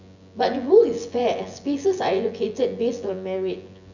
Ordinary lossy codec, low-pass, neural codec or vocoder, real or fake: none; 7.2 kHz; vocoder, 24 kHz, 100 mel bands, Vocos; fake